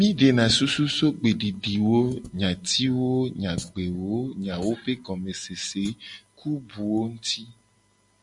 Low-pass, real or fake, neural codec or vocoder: 10.8 kHz; real; none